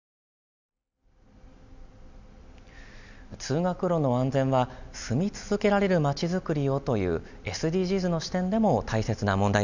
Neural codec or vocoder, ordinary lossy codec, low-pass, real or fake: none; none; 7.2 kHz; real